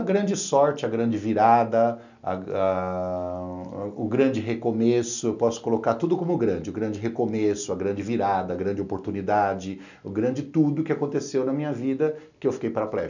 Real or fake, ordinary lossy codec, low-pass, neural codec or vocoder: real; none; 7.2 kHz; none